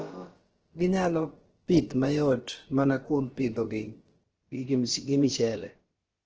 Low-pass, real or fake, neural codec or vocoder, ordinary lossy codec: 7.2 kHz; fake; codec, 16 kHz, about 1 kbps, DyCAST, with the encoder's durations; Opus, 16 kbps